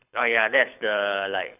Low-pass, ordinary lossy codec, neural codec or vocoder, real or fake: 3.6 kHz; none; codec, 24 kHz, 6 kbps, HILCodec; fake